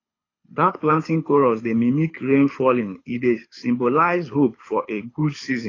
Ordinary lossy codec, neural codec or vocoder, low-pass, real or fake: AAC, 32 kbps; codec, 24 kHz, 6 kbps, HILCodec; 7.2 kHz; fake